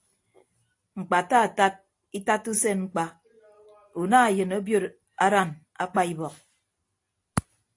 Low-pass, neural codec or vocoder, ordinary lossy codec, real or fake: 10.8 kHz; none; AAC, 48 kbps; real